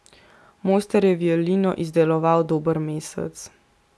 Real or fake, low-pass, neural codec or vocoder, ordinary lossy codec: real; none; none; none